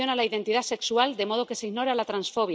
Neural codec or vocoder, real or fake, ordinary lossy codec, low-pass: none; real; none; none